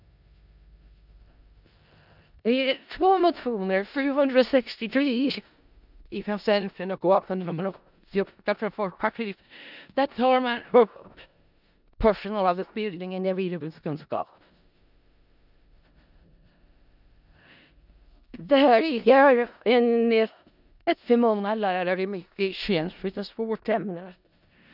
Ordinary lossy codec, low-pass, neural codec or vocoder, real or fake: none; 5.4 kHz; codec, 16 kHz in and 24 kHz out, 0.4 kbps, LongCat-Audio-Codec, four codebook decoder; fake